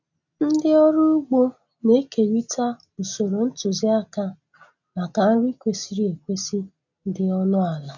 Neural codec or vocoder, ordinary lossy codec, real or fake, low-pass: none; none; real; 7.2 kHz